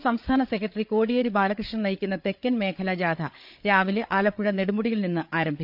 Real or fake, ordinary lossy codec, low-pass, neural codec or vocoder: fake; none; 5.4 kHz; codec, 16 kHz, 8 kbps, FreqCodec, larger model